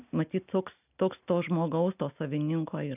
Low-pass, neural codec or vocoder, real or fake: 3.6 kHz; none; real